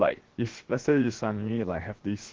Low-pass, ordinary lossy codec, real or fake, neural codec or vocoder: 7.2 kHz; Opus, 16 kbps; fake; codec, 16 kHz, 0.7 kbps, FocalCodec